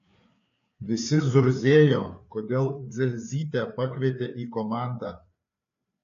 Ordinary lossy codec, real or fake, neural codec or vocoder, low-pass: AAC, 48 kbps; fake; codec, 16 kHz, 4 kbps, FreqCodec, larger model; 7.2 kHz